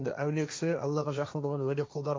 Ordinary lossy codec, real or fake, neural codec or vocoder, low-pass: none; fake; codec, 16 kHz, 1.1 kbps, Voila-Tokenizer; none